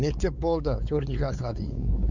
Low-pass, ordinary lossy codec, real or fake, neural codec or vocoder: 7.2 kHz; none; fake; codec, 16 kHz, 4 kbps, X-Codec, WavLM features, trained on Multilingual LibriSpeech